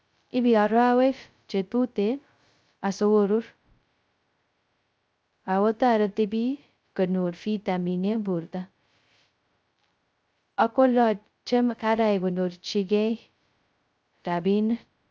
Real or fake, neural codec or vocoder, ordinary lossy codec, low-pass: fake; codec, 16 kHz, 0.2 kbps, FocalCodec; none; none